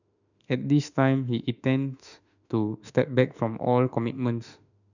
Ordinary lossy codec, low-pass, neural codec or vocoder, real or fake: none; 7.2 kHz; autoencoder, 48 kHz, 32 numbers a frame, DAC-VAE, trained on Japanese speech; fake